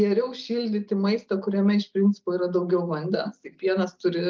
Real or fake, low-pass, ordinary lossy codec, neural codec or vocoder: real; 7.2 kHz; Opus, 24 kbps; none